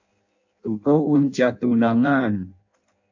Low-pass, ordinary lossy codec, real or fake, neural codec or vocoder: 7.2 kHz; AAC, 48 kbps; fake; codec, 16 kHz in and 24 kHz out, 0.6 kbps, FireRedTTS-2 codec